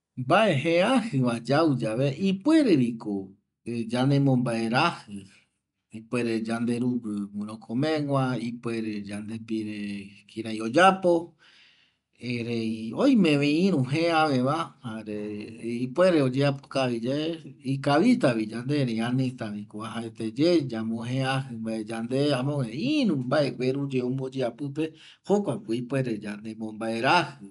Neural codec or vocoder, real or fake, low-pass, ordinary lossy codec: none; real; 10.8 kHz; none